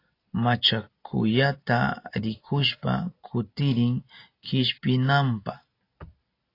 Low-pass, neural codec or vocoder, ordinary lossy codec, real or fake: 5.4 kHz; none; AAC, 32 kbps; real